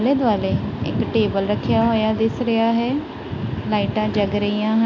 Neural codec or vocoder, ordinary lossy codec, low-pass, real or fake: none; AAC, 48 kbps; 7.2 kHz; real